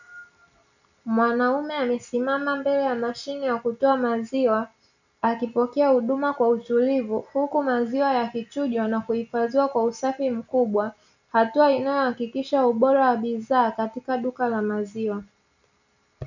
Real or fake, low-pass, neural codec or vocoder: real; 7.2 kHz; none